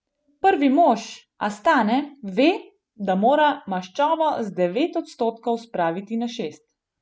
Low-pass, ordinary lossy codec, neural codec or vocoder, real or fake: none; none; none; real